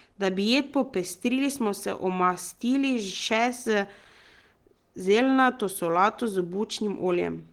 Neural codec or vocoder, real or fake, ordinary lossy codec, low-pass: none; real; Opus, 16 kbps; 19.8 kHz